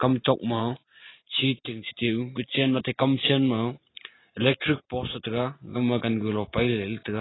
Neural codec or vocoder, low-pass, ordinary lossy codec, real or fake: none; 7.2 kHz; AAC, 16 kbps; real